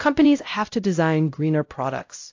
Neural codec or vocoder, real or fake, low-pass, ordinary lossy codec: codec, 16 kHz, 0.5 kbps, X-Codec, WavLM features, trained on Multilingual LibriSpeech; fake; 7.2 kHz; AAC, 48 kbps